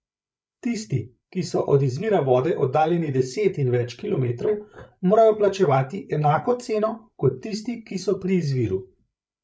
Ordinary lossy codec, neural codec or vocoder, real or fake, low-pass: none; codec, 16 kHz, 8 kbps, FreqCodec, larger model; fake; none